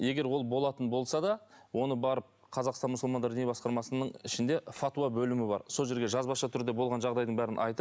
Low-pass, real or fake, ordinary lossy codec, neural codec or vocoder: none; real; none; none